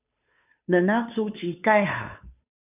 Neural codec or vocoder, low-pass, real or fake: codec, 16 kHz, 2 kbps, FunCodec, trained on Chinese and English, 25 frames a second; 3.6 kHz; fake